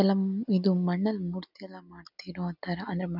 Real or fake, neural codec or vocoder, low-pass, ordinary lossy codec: real; none; 5.4 kHz; none